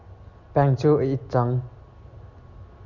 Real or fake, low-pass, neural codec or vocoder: real; 7.2 kHz; none